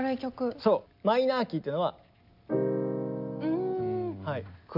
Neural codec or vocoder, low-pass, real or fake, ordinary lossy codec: none; 5.4 kHz; real; AAC, 48 kbps